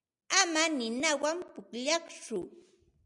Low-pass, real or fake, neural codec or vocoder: 10.8 kHz; real; none